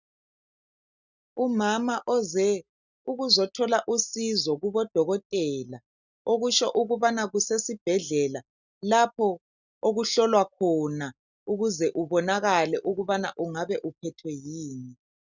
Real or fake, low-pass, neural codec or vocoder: real; 7.2 kHz; none